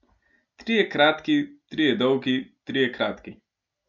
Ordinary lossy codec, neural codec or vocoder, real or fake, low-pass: none; none; real; 7.2 kHz